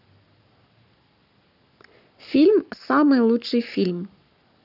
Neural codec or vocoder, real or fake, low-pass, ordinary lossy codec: codec, 44.1 kHz, 7.8 kbps, Pupu-Codec; fake; 5.4 kHz; none